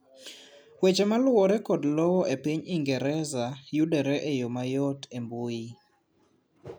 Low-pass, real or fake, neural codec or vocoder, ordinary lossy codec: none; real; none; none